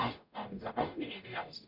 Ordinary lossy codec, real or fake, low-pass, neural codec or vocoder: none; fake; 5.4 kHz; codec, 44.1 kHz, 0.9 kbps, DAC